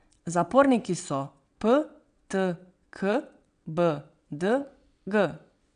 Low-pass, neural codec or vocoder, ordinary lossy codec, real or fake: 9.9 kHz; vocoder, 22.05 kHz, 80 mel bands, WaveNeXt; none; fake